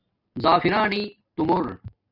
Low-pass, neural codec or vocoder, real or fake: 5.4 kHz; none; real